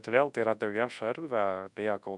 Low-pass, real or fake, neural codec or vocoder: 10.8 kHz; fake; codec, 24 kHz, 0.9 kbps, WavTokenizer, large speech release